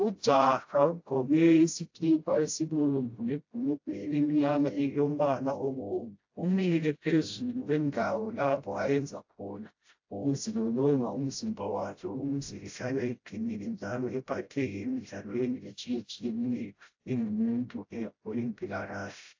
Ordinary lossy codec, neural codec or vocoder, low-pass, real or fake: AAC, 48 kbps; codec, 16 kHz, 0.5 kbps, FreqCodec, smaller model; 7.2 kHz; fake